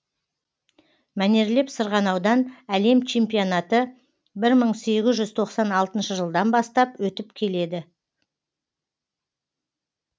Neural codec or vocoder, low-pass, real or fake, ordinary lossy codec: none; none; real; none